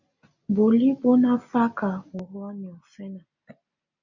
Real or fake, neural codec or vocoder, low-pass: real; none; 7.2 kHz